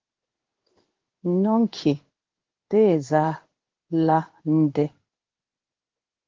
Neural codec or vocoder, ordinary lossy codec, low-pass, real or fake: codec, 16 kHz in and 24 kHz out, 1 kbps, XY-Tokenizer; Opus, 16 kbps; 7.2 kHz; fake